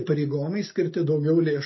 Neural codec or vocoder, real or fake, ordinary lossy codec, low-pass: none; real; MP3, 24 kbps; 7.2 kHz